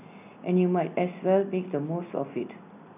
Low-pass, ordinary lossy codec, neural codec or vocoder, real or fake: 3.6 kHz; none; none; real